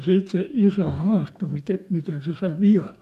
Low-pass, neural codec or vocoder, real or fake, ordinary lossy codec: 14.4 kHz; codec, 44.1 kHz, 2.6 kbps, DAC; fake; none